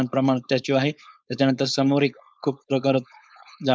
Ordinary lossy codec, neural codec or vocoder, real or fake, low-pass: none; codec, 16 kHz, 4.8 kbps, FACodec; fake; none